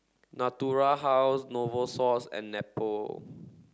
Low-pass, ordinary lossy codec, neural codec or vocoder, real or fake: none; none; none; real